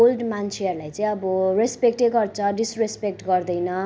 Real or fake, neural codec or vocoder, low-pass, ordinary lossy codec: real; none; none; none